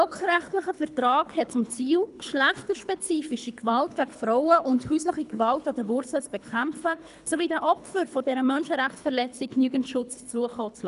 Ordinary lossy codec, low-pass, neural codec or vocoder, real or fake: none; 10.8 kHz; codec, 24 kHz, 3 kbps, HILCodec; fake